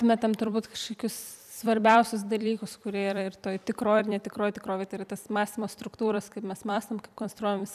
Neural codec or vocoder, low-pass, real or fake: vocoder, 44.1 kHz, 128 mel bands every 256 samples, BigVGAN v2; 14.4 kHz; fake